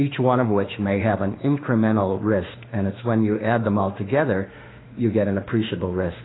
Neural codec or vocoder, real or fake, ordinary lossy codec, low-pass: autoencoder, 48 kHz, 32 numbers a frame, DAC-VAE, trained on Japanese speech; fake; AAC, 16 kbps; 7.2 kHz